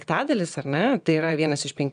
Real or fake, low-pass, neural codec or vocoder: fake; 9.9 kHz; vocoder, 22.05 kHz, 80 mel bands, WaveNeXt